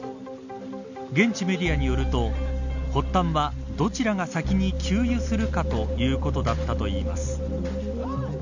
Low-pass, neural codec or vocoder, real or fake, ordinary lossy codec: 7.2 kHz; none; real; none